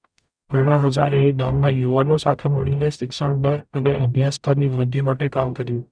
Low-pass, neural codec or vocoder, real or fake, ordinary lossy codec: 9.9 kHz; codec, 44.1 kHz, 0.9 kbps, DAC; fake; none